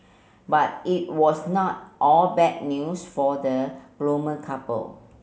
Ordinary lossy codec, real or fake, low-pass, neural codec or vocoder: none; real; none; none